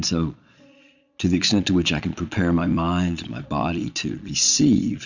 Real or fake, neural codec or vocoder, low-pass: fake; vocoder, 22.05 kHz, 80 mel bands, Vocos; 7.2 kHz